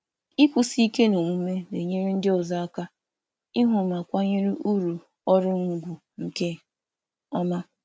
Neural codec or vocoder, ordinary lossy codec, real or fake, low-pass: none; none; real; none